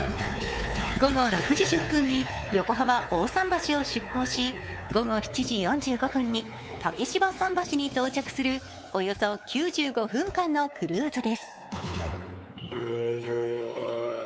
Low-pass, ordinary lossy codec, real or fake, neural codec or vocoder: none; none; fake; codec, 16 kHz, 4 kbps, X-Codec, WavLM features, trained on Multilingual LibriSpeech